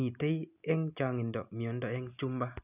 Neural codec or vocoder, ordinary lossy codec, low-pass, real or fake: none; none; 3.6 kHz; real